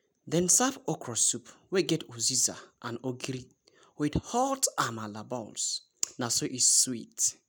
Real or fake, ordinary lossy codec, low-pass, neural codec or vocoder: real; none; none; none